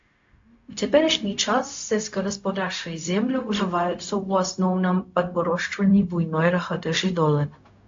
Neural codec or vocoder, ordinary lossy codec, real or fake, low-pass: codec, 16 kHz, 0.4 kbps, LongCat-Audio-Codec; AAC, 48 kbps; fake; 7.2 kHz